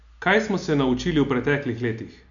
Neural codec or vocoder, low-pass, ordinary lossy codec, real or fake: none; 7.2 kHz; none; real